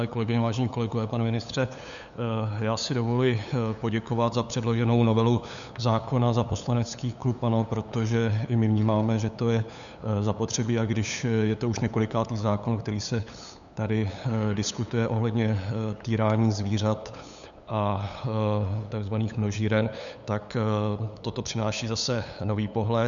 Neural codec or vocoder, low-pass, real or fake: codec, 16 kHz, 8 kbps, FunCodec, trained on LibriTTS, 25 frames a second; 7.2 kHz; fake